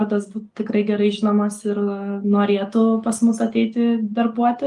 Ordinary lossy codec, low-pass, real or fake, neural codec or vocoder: Opus, 32 kbps; 10.8 kHz; real; none